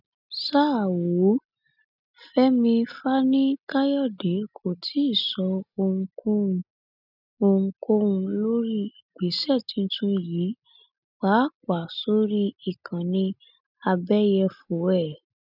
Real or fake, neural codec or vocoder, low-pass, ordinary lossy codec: real; none; 5.4 kHz; none